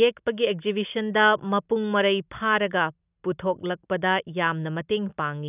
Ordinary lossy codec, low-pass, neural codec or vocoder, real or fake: none; 3.6 kHz; none; real